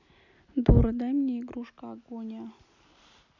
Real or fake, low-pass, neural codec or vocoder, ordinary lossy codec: real; 7.2 kHz; none; none